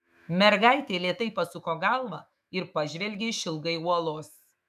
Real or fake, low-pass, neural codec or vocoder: fake; 14.4 kHz; autoencoder, 48 kHz, 128 numbers a frame, DAC-VAE, trained on Japanese speech